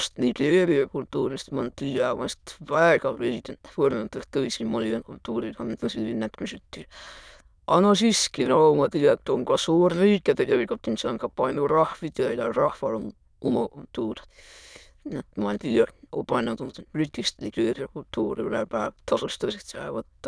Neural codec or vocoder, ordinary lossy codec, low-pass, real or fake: autoencoder, 22.05 kHz, a latent of 192 numbers a frame, VITS, trained on many speakers; none; none; fake